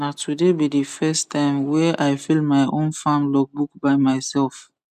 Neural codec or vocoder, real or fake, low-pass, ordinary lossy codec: none; real; 14.4 kHz; none